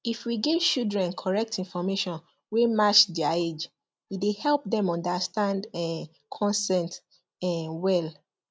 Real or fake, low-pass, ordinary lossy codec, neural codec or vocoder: real; none; none; none